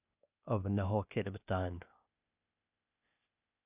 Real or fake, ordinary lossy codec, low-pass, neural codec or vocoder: fake; none; 3.6 kHz; codec, 16 kHz, 0.8 kbps, ZipCodec